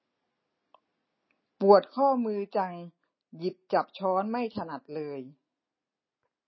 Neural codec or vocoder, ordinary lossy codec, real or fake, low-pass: none; MP3, 24 kbps; real; 7.2 kHz